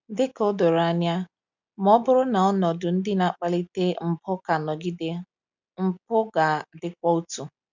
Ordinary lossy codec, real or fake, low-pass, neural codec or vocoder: none; real; 7.2 kHz; none